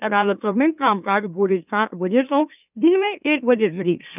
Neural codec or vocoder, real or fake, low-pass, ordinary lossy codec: autoencoder, 44.1 kHz, a latent of 192 numbers a frame, MeloTTS; fake; 3.6 kHz; none